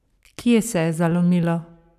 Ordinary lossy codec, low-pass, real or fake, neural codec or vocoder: none; 14.4 kHz; fake; codec, 44.1 kHz, 7.8 kbps, Pupu-Codec